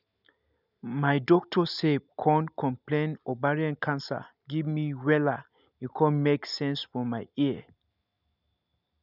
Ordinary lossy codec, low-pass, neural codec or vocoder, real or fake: none; 5.4 kHz; none; real